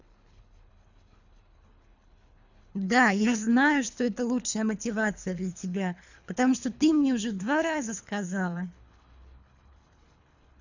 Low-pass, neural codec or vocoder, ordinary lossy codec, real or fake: 7.2 kHz; codec, 24 kHz, 3 kbps, HILCodec; none; fake